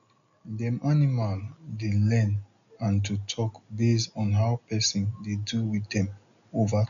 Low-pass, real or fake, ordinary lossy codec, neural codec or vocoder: 7.2 kHz; real; none; none